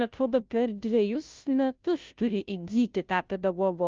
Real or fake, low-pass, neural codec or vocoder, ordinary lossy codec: fake; 7.2 kHz; codec, 16 kHz, 0.5 kbps, FunCodec, trained on Chinese and English, 25 frames a second; Opus, 24 kbps